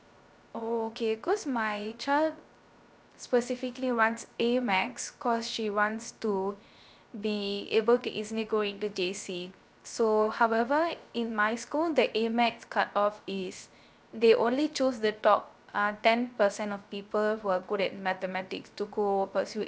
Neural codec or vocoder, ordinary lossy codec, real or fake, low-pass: codec, 16 kHz, 0.3 kbps, FocalCodec; none; fake; none